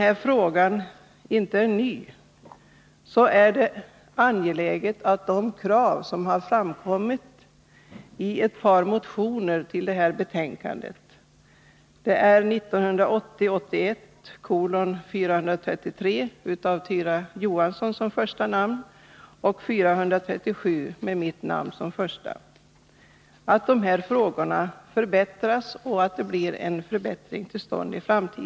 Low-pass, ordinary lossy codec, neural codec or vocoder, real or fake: none; none; none; real